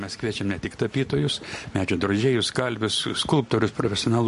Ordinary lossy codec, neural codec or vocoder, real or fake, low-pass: MP3, 48 kbps; none; real; 14.4 kHz